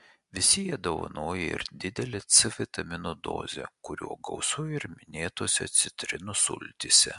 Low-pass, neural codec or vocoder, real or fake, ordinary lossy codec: 10.8 kHz; none; real; MP3, 64 kbps